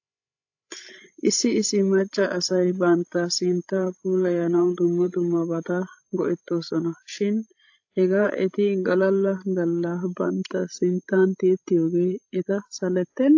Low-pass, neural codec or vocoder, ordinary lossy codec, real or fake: 7.2 kHz; codec, 16 kHz, 16 kbps, FreqCodec, larger model; AAC, 48 kbps; fake